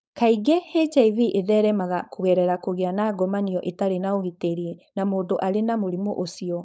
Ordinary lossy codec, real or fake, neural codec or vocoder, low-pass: none; fake; codec, 16 kHz, 4.8 kbps, FACodec; none